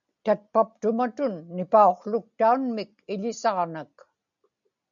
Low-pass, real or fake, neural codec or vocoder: 7.2 kHz; real; none